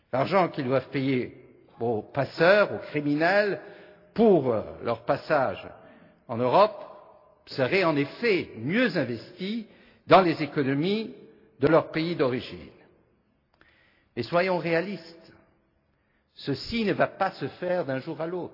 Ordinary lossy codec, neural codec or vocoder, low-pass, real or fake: AAC, 32 kbps; none; 5.4 kHz; real